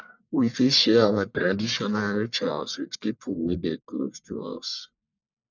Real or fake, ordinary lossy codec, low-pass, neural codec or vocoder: fake; none; 7.2 kHz; codec, 44.1 kHz, 1.7 kbps, Pupu-Codec